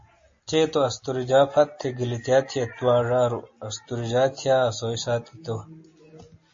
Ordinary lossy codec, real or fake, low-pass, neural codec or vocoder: MP3, 32 kbps; real; 7.2 kHz; none